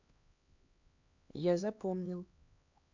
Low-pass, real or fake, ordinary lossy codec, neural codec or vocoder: 7.2 kHz; fake; AAC, 48 kbps; codec, 16 kHz, 2 kbps, X-Codec, HuBERT features, trained on LibriSpeech